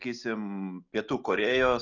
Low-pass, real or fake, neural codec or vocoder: 7.2 kHz; real; none